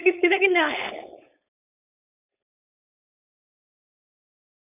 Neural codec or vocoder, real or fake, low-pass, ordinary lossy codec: codec, 16 kHz, 4.8 kbps, FACodec; fake; 3.6 kHz; none